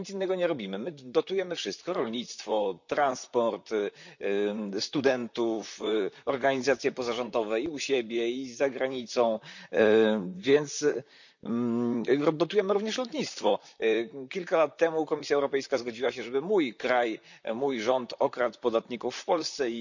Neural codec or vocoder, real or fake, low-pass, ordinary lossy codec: vocoder, 44.1 kHz, 128 mel bands, Pupu-Vocoder; fake; 7.2 kHz; none